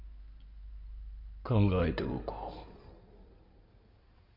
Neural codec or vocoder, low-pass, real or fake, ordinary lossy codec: codec, 16 kHz in and 24 kHz out, 2.2 kbps, FireRedTTS-2 codec; 5.4 kHz; fake; none